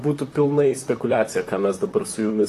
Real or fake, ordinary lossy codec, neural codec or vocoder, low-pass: fake; AAC, 48 kbps; vocoder, 44.1 kHz, 128 mel bands, Pupu-Vocoder; 14.4 kHz